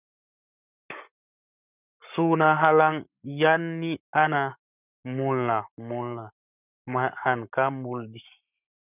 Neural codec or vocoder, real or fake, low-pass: codec, 44.1 kHz, 7.8 kbps, Pupu-Codec; fake; 3.6 kHz